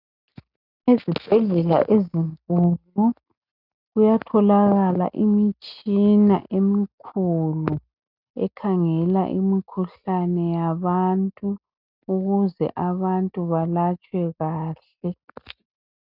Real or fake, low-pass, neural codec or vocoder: real; 5.4 kHz; none